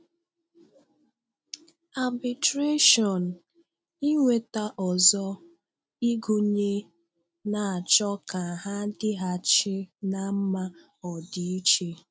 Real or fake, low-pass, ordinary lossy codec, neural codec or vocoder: real; none; none; none